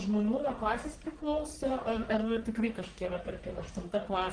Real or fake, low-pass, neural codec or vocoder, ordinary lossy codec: fake; 9.9 kHz; codec, 44.1 kHz, 1.7 kbps, Pupu-Codec; Opus, 24 kbps